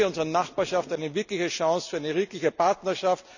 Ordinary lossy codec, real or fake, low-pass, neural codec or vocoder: none; real; 7.2 kHz; none